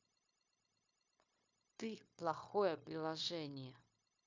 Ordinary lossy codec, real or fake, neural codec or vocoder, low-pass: MP3, 64 kbps; fake; codec, 16 kHz, 0.9 kbps, LongCat-Audio-Codec; 7.2 kHz